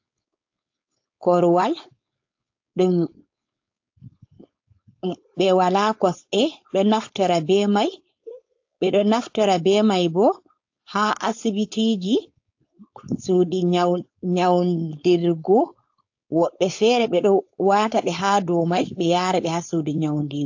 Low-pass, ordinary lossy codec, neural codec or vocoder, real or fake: 7.2 kHz; AAC, 48 kbps; codec, 16 kHz, 4.8 kbps, FACodec; fake